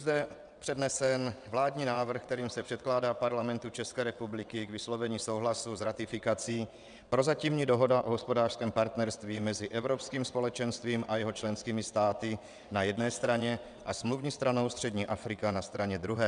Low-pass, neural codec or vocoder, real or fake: 9.9 kHz; vocoder, 22.05 kHz, 80 mel bands, WaveNeXt; fake